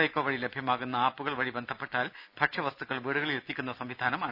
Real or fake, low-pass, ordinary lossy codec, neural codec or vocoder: real; 5.4 kHz; none; none